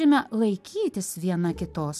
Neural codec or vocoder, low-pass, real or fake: none; 14.4 kHz; real